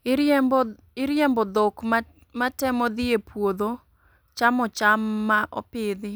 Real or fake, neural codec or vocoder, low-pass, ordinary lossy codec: real; none; none; none